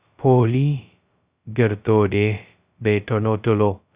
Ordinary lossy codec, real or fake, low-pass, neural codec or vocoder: Opus, 64 kbps; fake; 3.6 kHz; codec, 16 kHz, 0.2 kbps, FocalCodec